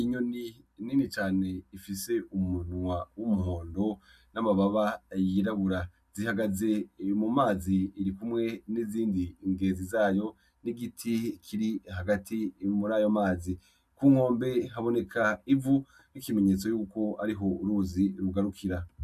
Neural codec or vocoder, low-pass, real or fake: none; 14.4 kHz; real